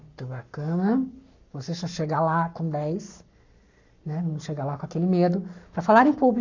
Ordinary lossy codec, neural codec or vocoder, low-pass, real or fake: none; codec, 44.1 kHz, 7.8 kbps, Pupu-Codec; 7.2 kHz; fake